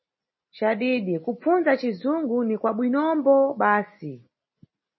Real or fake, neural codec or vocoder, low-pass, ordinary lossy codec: real; none; 7.2 kHz; MP3, 24 kbps